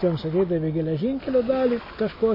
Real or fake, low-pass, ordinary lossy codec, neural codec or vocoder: real; 5.4 kHz; MP3, 24 kbps; none